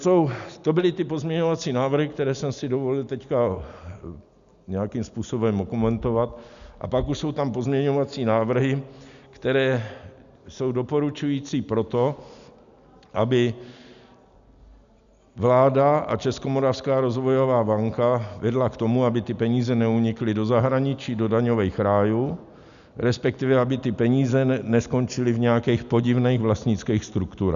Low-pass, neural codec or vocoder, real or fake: 7.2 kHz; none; real